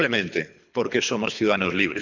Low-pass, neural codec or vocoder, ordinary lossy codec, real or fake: 7.2 kHz; codec, 24 kHz, 3 kbps, HILCodec; none; fake